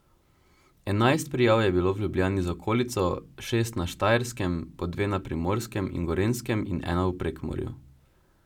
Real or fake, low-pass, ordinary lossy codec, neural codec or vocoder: real; 19.8 kHz; none; none